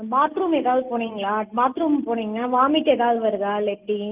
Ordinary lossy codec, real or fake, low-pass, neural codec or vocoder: Opus, 24 kbps; real; 3.6 kHz; none